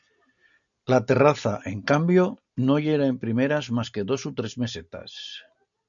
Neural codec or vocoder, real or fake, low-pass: none; real; 7.2 kHz